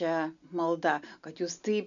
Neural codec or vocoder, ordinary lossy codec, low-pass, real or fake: none; MP3, 96 kbps; 7.2 kHz; real